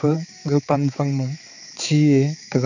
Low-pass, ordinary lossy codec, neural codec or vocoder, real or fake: 7.2 kHz; none; codec, 16 kHz, 4 kbps, X-Codec, HuBERT features, trained on balanced general audio; fake